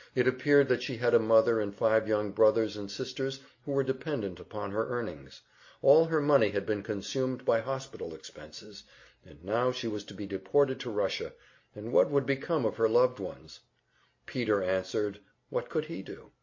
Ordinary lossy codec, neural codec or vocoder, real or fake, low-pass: MP3, 32 kbps; none; real; 7.2 kHz